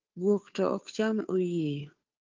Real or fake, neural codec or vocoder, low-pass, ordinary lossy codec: fake; codec, 16 kHz, 2 kbps, FunCodec, trained on Chinese and English, 25 frames a second; 7.2 kHz; Opus, 24 kbps